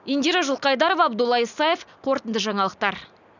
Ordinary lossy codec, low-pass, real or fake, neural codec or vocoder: none; 7.2 kHz; real; none